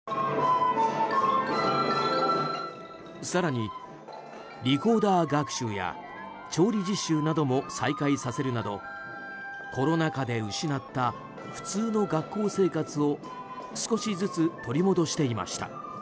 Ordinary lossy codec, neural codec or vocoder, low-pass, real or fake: none; none; none; real